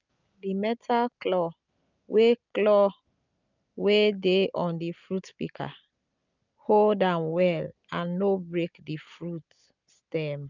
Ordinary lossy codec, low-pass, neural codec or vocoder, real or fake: none; 7.2 kHz; none; real